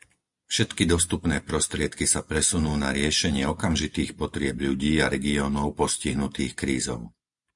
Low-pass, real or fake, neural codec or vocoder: 10.8 kHz; real; none